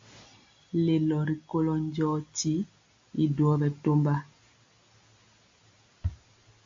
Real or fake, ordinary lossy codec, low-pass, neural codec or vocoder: real; MP3, 48 kbps; 7.2 kHz; none